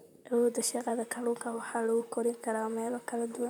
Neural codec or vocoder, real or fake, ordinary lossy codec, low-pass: vocoder, 44.1 kHz, 128 mel bands, Pupu-Vocoder; fake; none; none